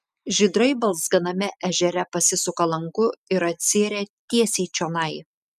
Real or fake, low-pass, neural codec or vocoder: real; 14.4 kHz; none